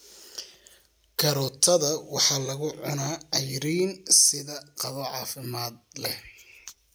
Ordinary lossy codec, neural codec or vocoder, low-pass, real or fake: none; none; none; real